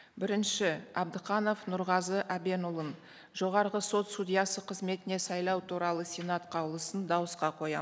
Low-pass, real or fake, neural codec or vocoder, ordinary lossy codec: none; real; none; none